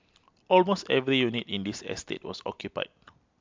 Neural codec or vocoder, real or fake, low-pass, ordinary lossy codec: none; real; 7.2 kHz; MP3, 64 kbps